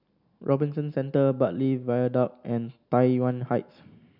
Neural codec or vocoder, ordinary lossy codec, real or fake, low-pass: none; none; real; 5.4 kHz